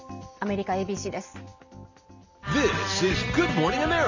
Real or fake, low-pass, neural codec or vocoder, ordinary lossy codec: real; 7.2 kHz; none; none